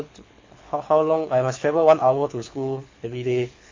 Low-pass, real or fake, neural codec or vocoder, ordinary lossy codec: 7.2 kHz; fake; codec, 24 kHz, 6 kbps, HILCodec; MP3, 48 kbps